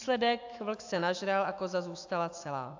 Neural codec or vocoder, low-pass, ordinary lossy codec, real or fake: autoencoder, 48 kHz, 128 numbers a frame, DAC-VAE, trained on Japanese speech; 7.2 kHz; AAC, 48 kbps; fake